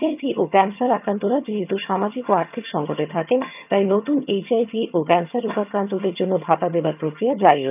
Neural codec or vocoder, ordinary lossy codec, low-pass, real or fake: vocoder, 22.05 kHz, 80 mel bands, HiFi-GAN; none; 3.6 kHz; fake